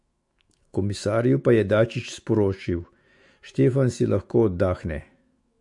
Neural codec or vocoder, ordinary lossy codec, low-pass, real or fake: autoencoder, 48 kHz, 128 numbers a frame, DAC-VAE, trained on Japanese speech; MP3, 48 kbps; 10.8 kHz; fake